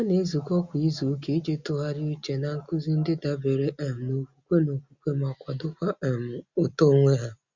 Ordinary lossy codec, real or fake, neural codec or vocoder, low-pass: Opus, 64 kbps; real; none; 7.2 kHz